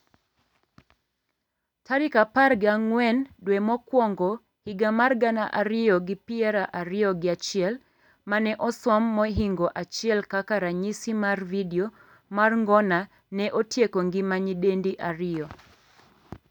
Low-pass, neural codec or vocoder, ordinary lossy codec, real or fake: 19.8 kHz; none; none; real